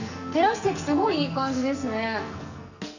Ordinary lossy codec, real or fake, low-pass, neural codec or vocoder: none; fake; 7.2 kHz; codec, 44.1 kHz, 2.6 kbps, SNAC